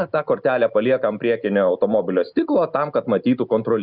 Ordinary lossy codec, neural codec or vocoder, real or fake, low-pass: Opus, 64 kbps; none; real; 5.4 kHz